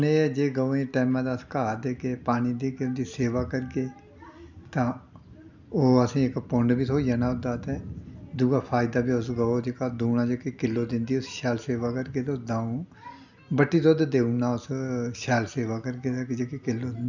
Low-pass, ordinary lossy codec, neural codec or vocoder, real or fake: 7.2 kHz; none; none; real